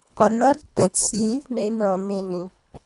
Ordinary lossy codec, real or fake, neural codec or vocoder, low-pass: none; fake; codec, 24 kHz, 1.5 kbps, HILCodec; 10.8 kHz